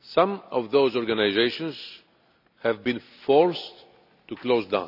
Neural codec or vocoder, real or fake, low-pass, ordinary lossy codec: none; real; 5.4 kHz; none